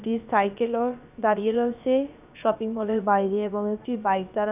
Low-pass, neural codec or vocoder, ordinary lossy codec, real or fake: 3.6 kHz; codec, 16 kHz, about 1 kbps, DyCAST, with the encoder's durations; none; fake